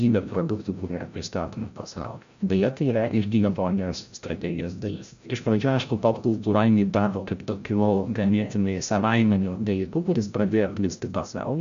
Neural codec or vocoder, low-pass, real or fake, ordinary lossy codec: codec, 16 kHz, 0.5 kbps, FreqCodec, larger model; 7.2 kHz; fake; MP3, 64 kbps